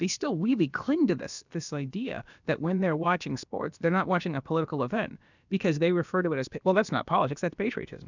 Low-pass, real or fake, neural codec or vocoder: 7.2 kHz; fake; codec, 16 kHz, about 1 kbps, DyCAST, with the encoder's durations